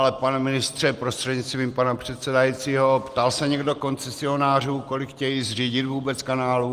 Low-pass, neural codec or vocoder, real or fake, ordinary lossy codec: 14.4 kHz; none; real; Opus, 24 kbps